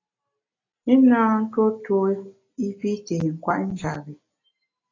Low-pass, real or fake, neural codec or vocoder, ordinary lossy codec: 7.2 kHz; real; none; AAC, 32 kbps